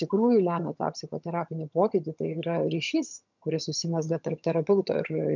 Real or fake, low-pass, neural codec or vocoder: fake; 7.2 kHz; vocoder, 22.05 kHz, 80 mel bands, HiFi-GAN